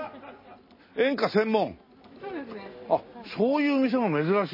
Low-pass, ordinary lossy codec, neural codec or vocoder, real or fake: 5.4 kHz; MP3, 24 kbps; none; real